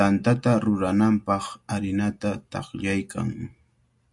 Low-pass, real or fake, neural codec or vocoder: 10.8 kHz; real; none